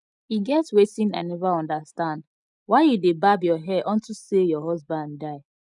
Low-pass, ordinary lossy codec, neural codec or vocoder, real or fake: 10.8 kHz; none; none; real